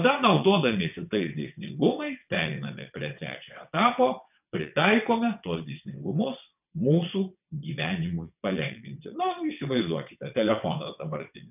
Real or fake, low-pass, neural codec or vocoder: fake; 3.6 kHz; vocoder, 22.05 kHz, 80 mel bands, WaveNeXt